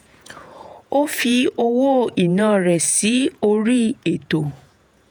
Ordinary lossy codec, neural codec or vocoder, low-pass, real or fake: none; vocoder, 48 kHz, 128 mel bands, Vocos; none; fake